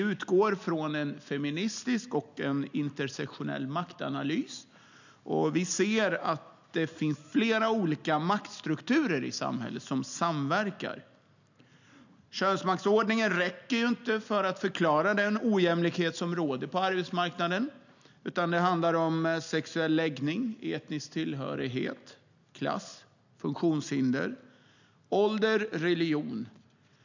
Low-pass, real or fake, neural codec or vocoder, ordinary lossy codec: 7.2 kHz; real; none; none